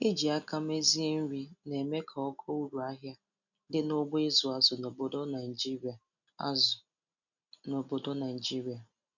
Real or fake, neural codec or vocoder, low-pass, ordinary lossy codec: real; none; 7.2 kHz; none